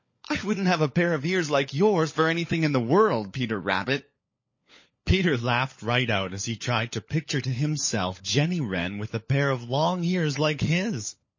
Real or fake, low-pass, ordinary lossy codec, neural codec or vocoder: fake; 7.2 kHz; MP3, 32 kbps; codec, 16 kHz, 16 kbps, FunCodec, trained on LibriTTS, 50 frames a second